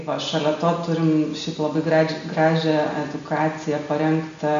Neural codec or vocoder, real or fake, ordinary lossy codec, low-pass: none; real; MP3, 64 kbps; 7.2 kHz